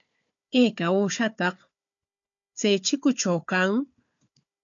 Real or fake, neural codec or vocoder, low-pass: fake; codec, 16 kHz, 4 kbps, FunCodec, trained on Chinese and English, 50 frames a second; 7.2 kHz